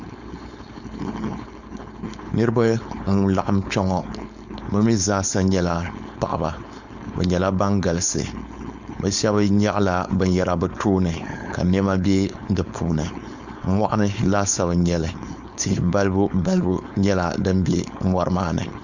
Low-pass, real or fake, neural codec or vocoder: 7.2 kHz; fake; codec, 16 kHz, 4.8 kbps, FACodec